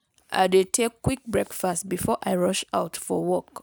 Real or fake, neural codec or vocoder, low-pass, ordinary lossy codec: real; none; none; none